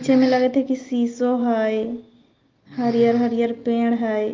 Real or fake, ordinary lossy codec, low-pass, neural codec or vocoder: real; Opus, 32 kbps; 7.2 kHz; none